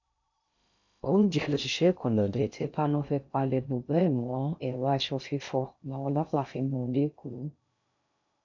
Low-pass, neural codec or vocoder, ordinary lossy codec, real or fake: 7.2 kHz; codec, 16 kHz in and 24 kHz out, 0.6 kbps, FocalCodec, streaming, 2048 codes; none; fake